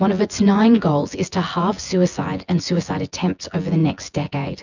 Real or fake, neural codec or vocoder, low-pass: fake; vocoder, 24 kHz, 100 mel bands, Vocos; 7.2 kHz